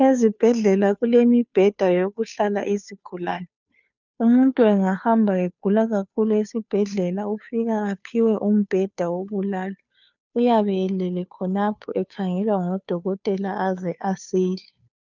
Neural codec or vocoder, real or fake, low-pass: codec, 16 kHz, 2 kbps, FunCodec, trained on Chinese and English, 25 frames a second; fake; 7.2 kHz